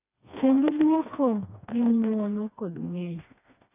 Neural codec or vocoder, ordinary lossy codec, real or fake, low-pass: codec, 16 kHz, 2 kbps, FreqCodec, smaller model; AAC, 32 kbps; fake; 3.6 kHz